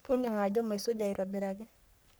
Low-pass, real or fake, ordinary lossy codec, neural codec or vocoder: none; fake; none; codec, 44.1 kHz, 3.4 kbps, Pupu-Codec